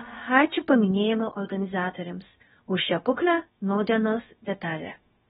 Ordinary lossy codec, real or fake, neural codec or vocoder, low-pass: AAC, 16 kbps; fake; codec, 16 kHz, about 1 kbps, DyCAST, with the encoder's durations; 7.2 kHz